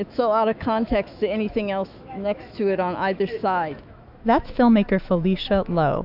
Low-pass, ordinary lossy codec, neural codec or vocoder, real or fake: 5.4 kHz; AAC, 48 kbps; autoencoder, 48 kHz, 128 numbers a frame, DAC-VAE, trained on Japanese speech; fake